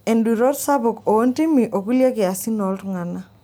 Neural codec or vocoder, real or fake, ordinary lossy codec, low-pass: none; real; none; none